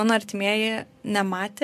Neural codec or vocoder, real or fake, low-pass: none; real; 14.4 kHz